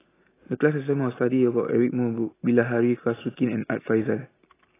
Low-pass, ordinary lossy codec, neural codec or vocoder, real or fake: 3.6 kHz; AAC, 16 kbps; none; real